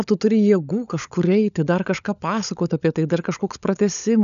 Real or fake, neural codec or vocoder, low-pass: fake; codec, 16 kHz, 16 kbps, FunCodec, trained on LibriTTS, 50 frames a second; 7.2 kHz